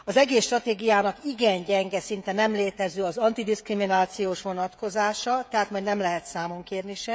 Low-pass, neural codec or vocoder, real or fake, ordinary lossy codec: none; codec, 16 kHz, 16 kbps, FreqCodec, smaller model; fake; none